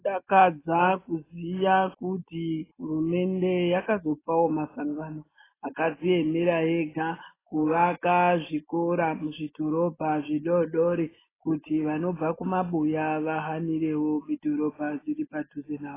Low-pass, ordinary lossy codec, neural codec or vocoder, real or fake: 3.6 kHz; AAC, 16 kbps; none; real